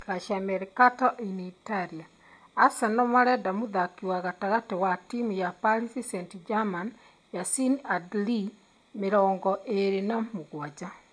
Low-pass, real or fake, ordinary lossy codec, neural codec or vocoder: 9.9 kHz; real; MP3, 64 kbps; none